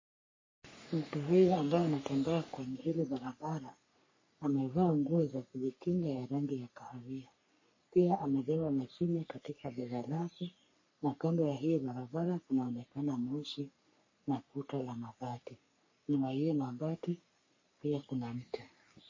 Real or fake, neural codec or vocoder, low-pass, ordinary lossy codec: fake; codec, 44.1 kHz, 3.4 kbps, Pupu-Codec; 7.2 kHz; MP3, 32 kbps